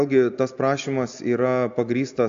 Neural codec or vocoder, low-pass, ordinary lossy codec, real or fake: none; 7.2 kHz; AAC, 64 kbps; real